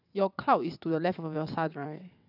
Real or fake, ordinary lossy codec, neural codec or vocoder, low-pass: fake; none; vocoder, 22.05 kHz, 80 mel bands, WaveNeXt; 5.4 kHz